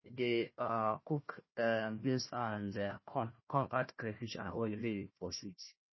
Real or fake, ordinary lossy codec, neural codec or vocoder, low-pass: fake; MP3, 24 kbps; codec, 16 kHz, 1 kbps, FunCodec, trained on Chinese and English, 50 frames a second; 7.2 kHz